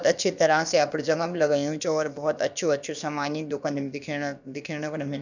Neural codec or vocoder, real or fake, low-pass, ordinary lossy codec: codec, 16 kHz, about 1 kbps, DyCAST, with the encoder's durations; fake; 7.2 kHz; none